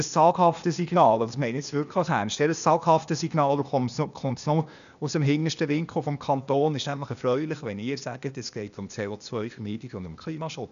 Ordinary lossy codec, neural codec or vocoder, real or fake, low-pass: none; codec, 16 kHz, 0.8 kbps, ZipCodec; fake; 7.2 kHz